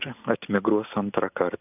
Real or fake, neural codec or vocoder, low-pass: real; none; 3.6 kHz